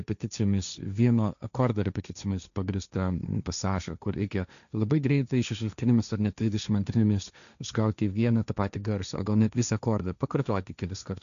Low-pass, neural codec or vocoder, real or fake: 7.2 kHz; codec, 16 kHz, 1.1 kbps, Voila-Tokenizer; fake